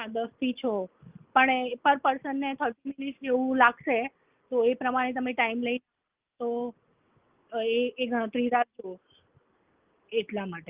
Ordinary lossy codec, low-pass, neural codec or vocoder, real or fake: Opus, 32 kbps; 3.6 kHz; none; real